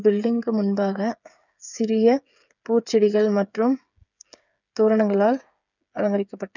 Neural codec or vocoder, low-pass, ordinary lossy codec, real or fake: codec, 16 kHz, 8 kbps, FreqCodec, smaller model; 7.2 kHz; none; fake